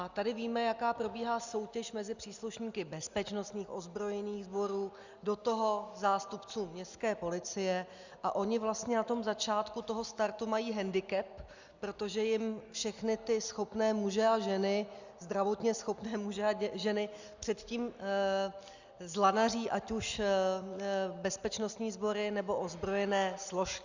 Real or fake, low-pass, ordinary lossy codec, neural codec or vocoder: real; 7.2 kHz; Opus, 64 kbps; none